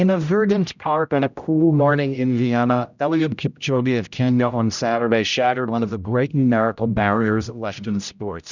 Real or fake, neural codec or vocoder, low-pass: fake; codec, 16 kHz, 0.5 kbps, X-Codec, HuBERT features, trained on general audio; 7.2 kHz